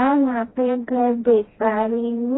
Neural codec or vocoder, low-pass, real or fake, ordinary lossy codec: codec, 16 kHz, 1 kbps, FreqCodec, smaller model; 7.2 kHz; fake; AAC, 16 kbps